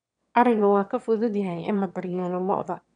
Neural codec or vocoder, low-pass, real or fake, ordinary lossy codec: autoencoder, 22.05 kHz, a latent of 192 numbers a frame, VITS, trained on one speaker; 9.9 kHz; fake; none